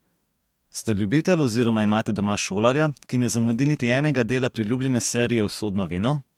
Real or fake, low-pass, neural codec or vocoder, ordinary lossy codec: fake; 19.8 kHz; codec, 44.1 kHz, 2.6 kbps, DAC; MP3, 96 kbps